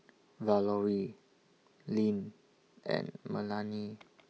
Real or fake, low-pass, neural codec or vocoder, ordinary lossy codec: real; none; none; none